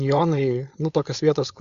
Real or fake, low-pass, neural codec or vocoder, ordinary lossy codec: fake; 7.2 kHz; codec, 16 kHz, 4.8 kbps, FACodec; Opus, 64 kbps